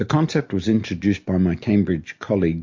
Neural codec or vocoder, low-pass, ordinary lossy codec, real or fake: none; 7.2 kHz; MP3, 48 kbps; real